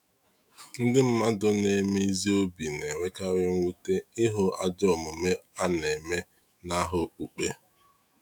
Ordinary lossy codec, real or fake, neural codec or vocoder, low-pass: none; fake; autoencoder, 48 kHz, 128 numbers a frame, DAC-VAE, trained on Japanese speech; none